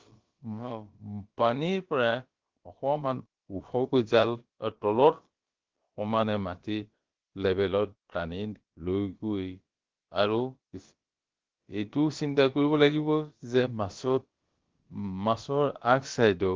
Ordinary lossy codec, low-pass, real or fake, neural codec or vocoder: Opus, 16 kbps; 7.2 kHz; fake; codec, 16 kHz, about 1 kbps, DyCAST, with the encoder's durations